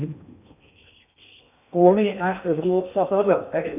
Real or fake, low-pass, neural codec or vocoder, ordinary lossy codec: fake; 3.6 kHz; codec, 16 kHz in and 24 kHz out, 0.6 kbps, FocalCodec, streaming, 4096 codes; none